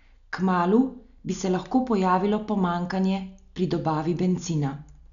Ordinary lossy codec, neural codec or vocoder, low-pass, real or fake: none; none; 7.2 kHz; real